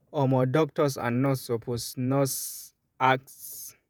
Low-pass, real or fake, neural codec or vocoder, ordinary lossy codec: none; fake; vocoder, 48 kHz, 128 mel bands, Vocos; none